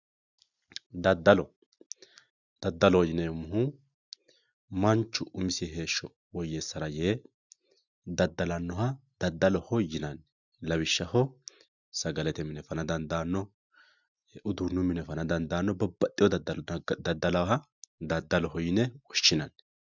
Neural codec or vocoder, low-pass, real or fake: none; 7.2 kHz; real